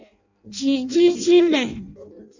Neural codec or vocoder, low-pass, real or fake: codec, 16 kHz in and 24 kHz out, 0.6 kbps, FireRedTTS-2 codec; 7.2 kHz; fake